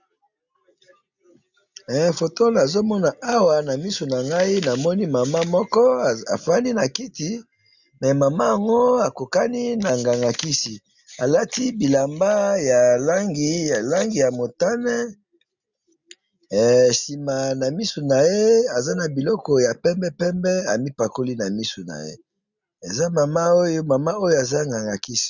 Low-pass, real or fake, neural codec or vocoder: 7.2 kHz; real; none